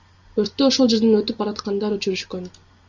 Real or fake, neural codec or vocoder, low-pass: real; none; 7.2 kHz